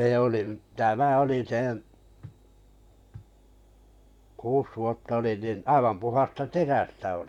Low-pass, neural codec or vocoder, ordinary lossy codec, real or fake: 19.8 kHz; vocoder, 44.1 kHz, 128 mel bands, Pupu-Vocoder; none; fake